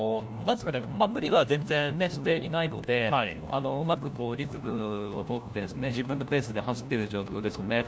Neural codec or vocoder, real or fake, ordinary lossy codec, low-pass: codec, 16 kHz, 1 kbps, FunCodec, trained on LibriTTS, 50 frames a second; fake; none; none